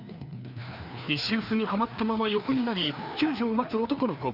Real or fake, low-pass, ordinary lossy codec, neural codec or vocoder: fake; 5.4 kHz; none; codec, 16 kHz, 2 kbps, FreqCodec, larger model